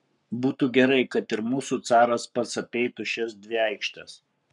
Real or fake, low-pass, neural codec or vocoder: fake; 10.8 kHz; codec, 44.1 kHz, 7.8 kbps, Pupu-Codec